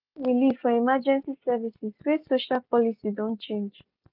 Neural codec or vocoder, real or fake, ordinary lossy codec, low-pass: none; real; none; 5.4 kHz